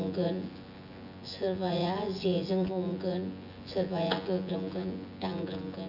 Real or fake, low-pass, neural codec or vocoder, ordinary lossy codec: fake; 5.4 kHz; vocoder, 24 kHz, 100 mel bands, Vocos; none